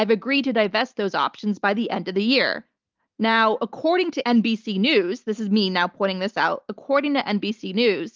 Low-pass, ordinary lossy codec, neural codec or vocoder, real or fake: 7.2 kHz; Opus, 32 kbps; none; real